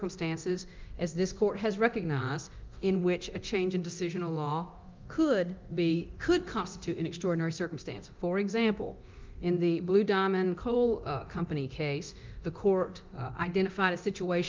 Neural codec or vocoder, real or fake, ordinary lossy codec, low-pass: codec, 24 kHz, 0.9 kbps, DualCodec; fake; Opus, 24 kbps; 7.2 kHz